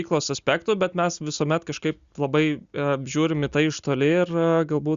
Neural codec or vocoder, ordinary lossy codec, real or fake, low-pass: none; Opus, 64 kbps; real; 7.2 kHz